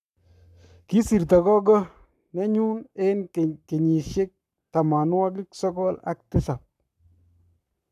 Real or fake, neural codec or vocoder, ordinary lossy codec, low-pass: fake; codec, 44.1 kHz, 7.8 kbps, Pupu-Codec; none; 14.4 kHz